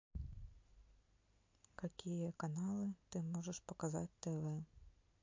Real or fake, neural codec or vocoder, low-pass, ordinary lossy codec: real; none; 7.2 kHz; MP3, 48 kbps